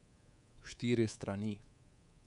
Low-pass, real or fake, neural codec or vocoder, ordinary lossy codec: 10.8 kHz; fake; codec, 24 kHz, 3.1 kbps, DualCodec; none